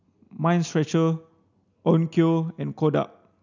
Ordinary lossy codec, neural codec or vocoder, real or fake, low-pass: none; none; real; 7.2 kHz